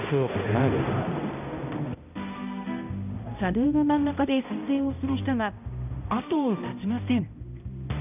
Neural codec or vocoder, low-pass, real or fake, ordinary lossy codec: codec, 16 kHz, 0.5 kbps, X-Codec, HuBERT features, trained on balanced general audio; 3.6 kHz; fake; none